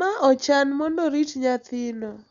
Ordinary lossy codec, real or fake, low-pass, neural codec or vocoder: MP3, 96 kbps; real; 7.2 kHz; none